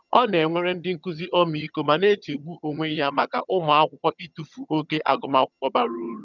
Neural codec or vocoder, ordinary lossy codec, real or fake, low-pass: vocoder, 22.05 kHz, 80 mel bands, HiFi-GAN; none; fake; 7.2 kHz